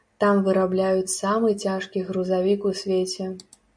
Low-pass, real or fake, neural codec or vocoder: 9.9 kHz; real; none